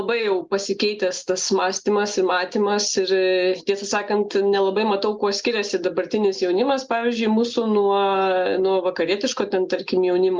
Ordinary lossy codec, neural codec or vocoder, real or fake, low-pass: Opus, 32 kbps; none; real; 7.2 kHz